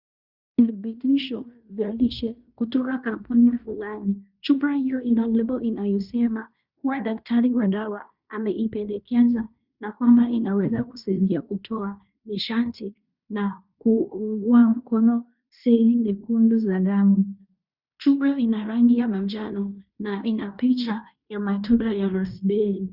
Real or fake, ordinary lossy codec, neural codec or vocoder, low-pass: fake; Opus, 64 kbps; codec, 16 kHz in and 24 kHz out, 0.9 kbps, LongCat-Audio-Codec, fine tuned four codebook decoder; 5.4 kHz